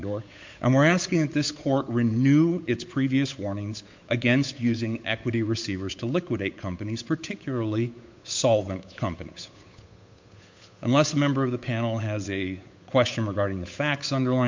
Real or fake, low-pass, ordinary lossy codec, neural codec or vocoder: fake; 7.2 kHz; MP3, 48 kbps; codec, 16 kHz, 8 kbps, FunCodec, trained on Chinese and English, 25 frames a second